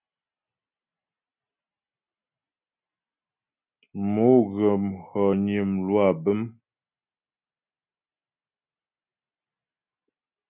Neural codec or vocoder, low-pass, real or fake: none; 3.6 kHz; real